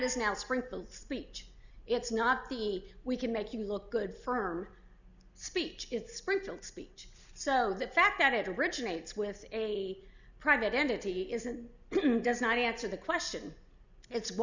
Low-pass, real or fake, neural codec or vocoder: 7.2 kHz; real; none